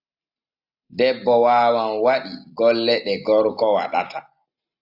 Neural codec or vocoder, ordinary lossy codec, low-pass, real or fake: none; AAC, 48 kbps; 5.4 kHz; real